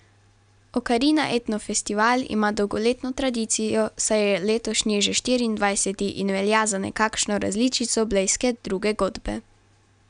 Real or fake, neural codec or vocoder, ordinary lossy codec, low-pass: real; none; none; 9.9 kHz